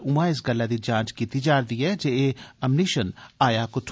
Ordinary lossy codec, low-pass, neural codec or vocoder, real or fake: none; none; none; real